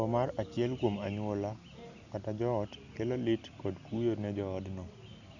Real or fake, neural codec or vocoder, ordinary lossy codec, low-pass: real; none; none; 7.2 kHz